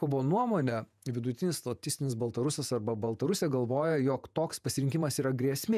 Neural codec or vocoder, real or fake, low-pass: vocoder, 48 kHz, 128 mel bands, Vocos; fake; 14.4 kHz